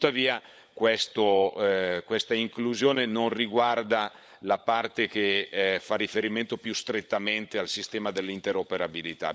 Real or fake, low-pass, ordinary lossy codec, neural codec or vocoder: fake; none; none; codec, 16 kHz, 16 kbps, FunCodec, trained on LibriTTS, 50 frames a second